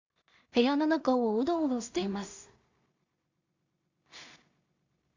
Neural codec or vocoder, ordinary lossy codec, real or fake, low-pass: codec, 16 kHz in and 24 kHz out, 0.4 kbps, LongCat-Audio-Codec, two codebook decoder; Opus, 64 kbps; fake; 7.2 kHz